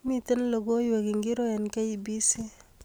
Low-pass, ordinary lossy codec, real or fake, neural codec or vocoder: none; none; real; none